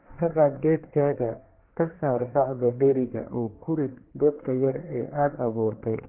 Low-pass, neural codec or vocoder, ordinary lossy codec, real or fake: 3.6 kHz; codec, 24 kHz, 1 kbps, SNAC; Opus, 16 kbps; fake